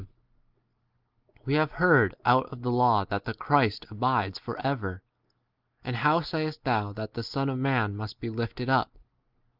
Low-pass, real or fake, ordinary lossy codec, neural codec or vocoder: 5.4 kHz; real; Opus, 32 kbps; none